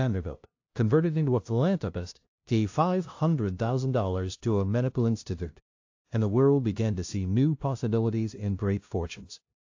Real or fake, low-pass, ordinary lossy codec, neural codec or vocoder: fake; 7.2 kHz; AAC, 48 kbps; codec, 16 kHz, 0.5 kbps, FunCodec, trained on LibriTTS, 25 frames a second